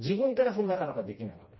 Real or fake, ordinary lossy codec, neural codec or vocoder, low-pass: fake; MP3, 24 kbps; codec, 16 kHz, 1 kbps, FreqCodec, smaller model; 7.2 kHz